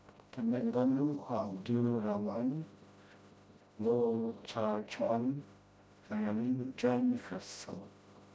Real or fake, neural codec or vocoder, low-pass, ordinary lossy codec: fake; codec, 16 kHz, 0.5 kbps, FreqCodec, smaller model; none; none